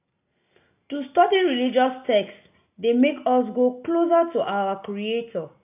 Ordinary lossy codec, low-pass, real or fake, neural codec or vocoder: AAC, 32 kbps; 3.6 kHz; real; none